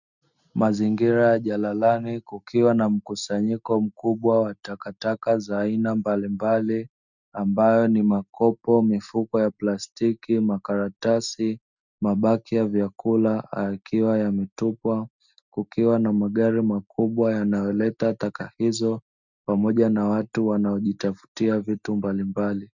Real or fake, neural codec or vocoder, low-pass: real; none; 7.2 kHz